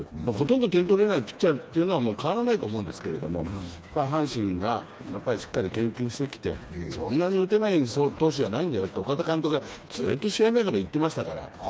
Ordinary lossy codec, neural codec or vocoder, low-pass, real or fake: none; codec, 16 kHz, 2 kbps, FreqCodec, smaller model; none; fake